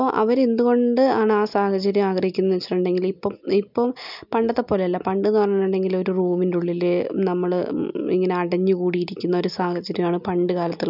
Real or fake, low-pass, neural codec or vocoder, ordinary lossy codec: real; 5.4 kHz; none; none